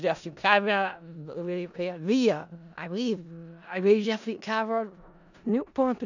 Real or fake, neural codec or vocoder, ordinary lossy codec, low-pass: fake; codec, 16 kHz in and 24 kHz out, 0.4 kbps, LongCat-Audio-Codec, four codebook decoder; none; 7.2 kHz